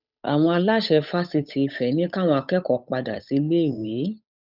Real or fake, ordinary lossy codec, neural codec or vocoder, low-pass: fake; none; codec, 16 kHz, 8 kbps, FunCodec, trained on Chinese and English, 25 frames a second; 5.4 kHz